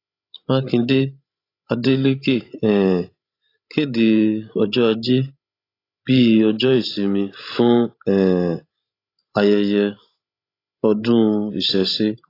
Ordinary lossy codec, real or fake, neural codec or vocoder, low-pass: AAC, 32 kbps; fake; codec, 16 kHz, 16 kbps, FreqCodec, larger model; 5.4 kHz